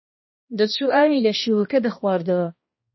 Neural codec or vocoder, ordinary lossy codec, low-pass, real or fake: codec, 16 kHz, 1 kbps, X-Codec, HuBERT features, trained on balanced general audio; MP3, 24 kbps; 7.2 kHz; fake